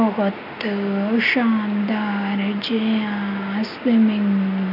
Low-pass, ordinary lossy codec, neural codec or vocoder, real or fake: 5.4 kHz; none; none; real